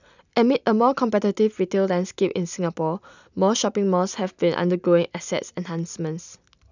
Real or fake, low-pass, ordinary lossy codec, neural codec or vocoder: real; 7.2 kHz; none; none